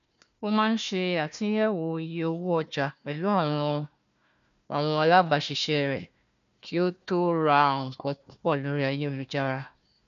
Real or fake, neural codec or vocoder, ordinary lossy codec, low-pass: fake; codec, 16 kHz, 1 kbps, FunCodec, trained on Chinese and English, 50 frames a second; none; 7.2 kHz